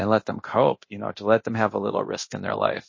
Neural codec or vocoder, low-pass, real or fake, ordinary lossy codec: codec, 24 kHz, 0.9 kbps, DualCodec; 7.2 kHz; fake; MP3, 32 kbps